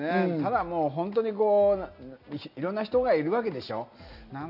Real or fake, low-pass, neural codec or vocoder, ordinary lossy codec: real; 5.4 kHz; none; none